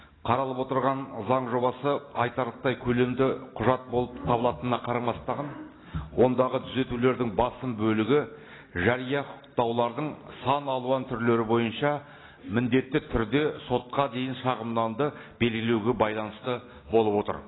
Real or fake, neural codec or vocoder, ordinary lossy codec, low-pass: real; none; AAC, 16 kbps; 7.2 kHz